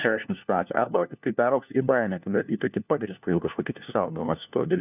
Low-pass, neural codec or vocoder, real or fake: 3.6 kHz; codec, 16 kHz, 1 kbps, FunCodec, trained on LibriTTS, 50 frames a second; fake